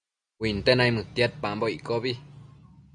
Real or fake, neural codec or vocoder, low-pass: real; none; 9.9 kHz